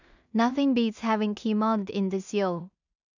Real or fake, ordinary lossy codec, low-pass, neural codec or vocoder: fake; none; 7.2 kHz; codec, 16 kHz in and 24 kHz out, 0.4 kbps, LongCat-Audio-Codec, two codebook decoder